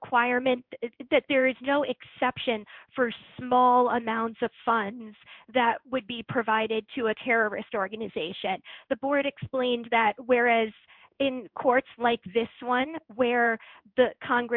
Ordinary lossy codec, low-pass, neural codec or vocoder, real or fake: MP3, 48 kbps; 5.4 kHz; codec, 16 kHz in and 24 kHz out, 1 kbps, XY-Tokenizer; fake